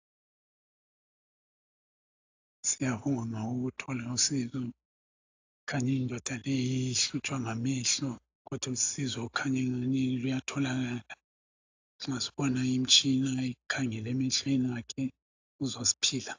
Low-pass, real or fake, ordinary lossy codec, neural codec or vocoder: 7.2 kHz; real; AAC, 48 kbps; none